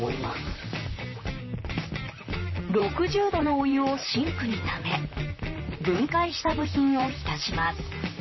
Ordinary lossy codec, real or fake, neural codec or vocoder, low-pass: MP3, 24 kbps; fake; vocoder, 44.1 kHz, 128 mel bands, Pupu-Vocoder; 7.2 kHz